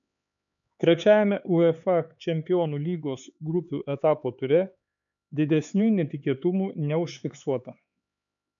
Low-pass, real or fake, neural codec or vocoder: 7.2 kHz; fake; codec, 16 kHz, 4 kbps, X-Codec, HuBERT features, trained on LibriSpeech